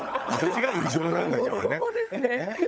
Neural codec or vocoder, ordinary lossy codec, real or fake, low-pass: codec, 16 kHz, 16 kbps, FunCodec, trained on LibriTTS, 50 frames a second; none; fake; none